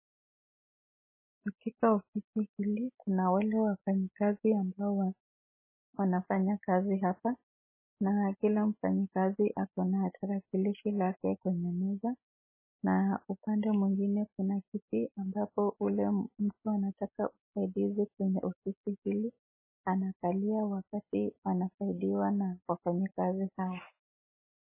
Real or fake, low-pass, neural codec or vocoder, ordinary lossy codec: real; 3.6 kHz; none; MP3, 16 kbps